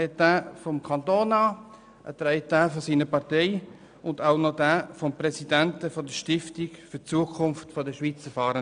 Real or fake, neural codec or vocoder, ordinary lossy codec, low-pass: real; none; none; 9.9 kHz